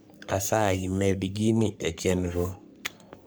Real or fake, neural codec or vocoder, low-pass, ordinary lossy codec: fake; codec, 44.1 kHz, 3.4 kbps, Pupu-Codec; none; none